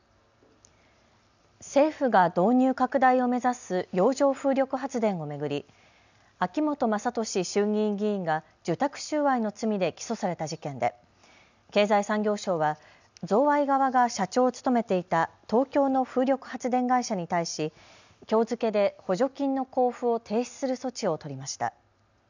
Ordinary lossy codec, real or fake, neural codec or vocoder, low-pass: none; real; none; 7.2 kHz